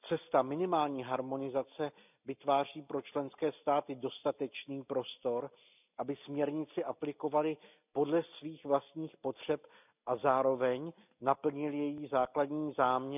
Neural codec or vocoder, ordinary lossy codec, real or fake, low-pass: none; none; real; 3.6 kHz